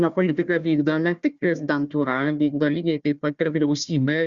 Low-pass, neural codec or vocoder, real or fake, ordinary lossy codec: 7.2 kHz; codec, 16 kHz, 1 kbps, FunCodec, trained on Chinese and English, 50 frames a second; fake; Opus, 64 kbps